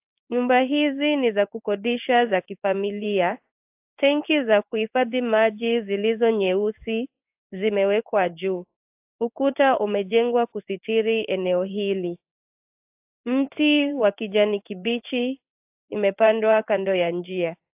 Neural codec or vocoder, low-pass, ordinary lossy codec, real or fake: codec, 16 kHz in and 24 kHz out, 1 kbps, XY-Tokenizer; 3.6 kHz; AAC, 32 kbps; fake